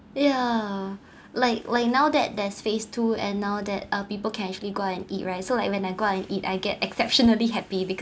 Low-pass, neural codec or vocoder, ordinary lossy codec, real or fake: none; none; none; real